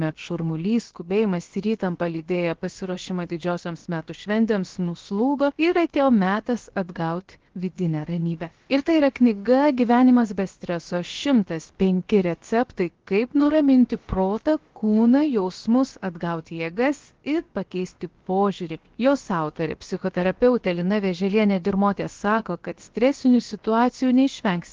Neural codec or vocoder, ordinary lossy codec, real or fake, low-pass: codec, 16 kHz, about 1 kbps, DyCAST, with the encoder's durations; Opus, 16 kbps; fake; 7.2 kHz